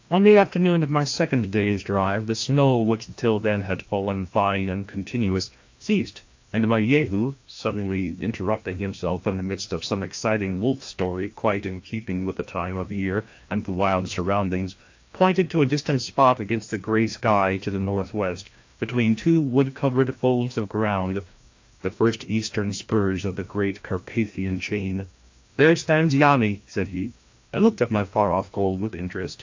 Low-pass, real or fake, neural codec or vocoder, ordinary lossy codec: 7.2 kHz; fake; codec, 16 kHz, 1 kbps, FreqCodec, larger model; AAC, 48 kbps